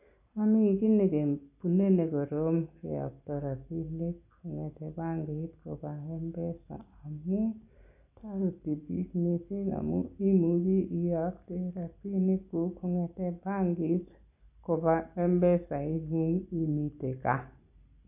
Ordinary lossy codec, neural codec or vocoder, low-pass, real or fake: AAC, 32 kbps; none; 3.6 kHz; real